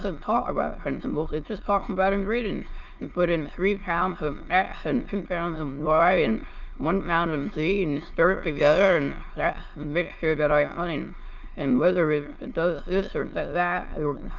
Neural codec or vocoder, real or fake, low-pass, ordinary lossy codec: autoencoder, 22.05 kHz, a latent of 192 numbers a frame, VITS, trained on many speakers; fake; 7.2 kHz; Opus, 32 kbps